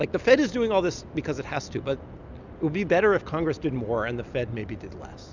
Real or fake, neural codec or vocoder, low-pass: real; none; 7.2 kHz